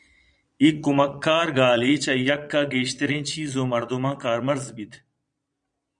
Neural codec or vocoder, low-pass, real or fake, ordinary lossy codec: vocoder, 22.05 kHz, 80 mel bands, Vocos; 9.9 kHz; fake; MP3, 96 kbps